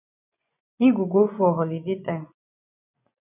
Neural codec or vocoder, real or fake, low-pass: vocoder, 24 kHz, 100 mel bands, Vocos; fake; 3.6 kHz